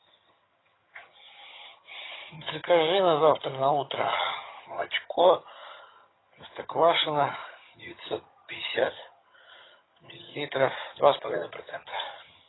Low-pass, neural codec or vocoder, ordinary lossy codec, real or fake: 7.2 kHz; vocoder, 22.05 kHz, 80 mel bands, HiFi-GAN; AAC, 16 kbps; fake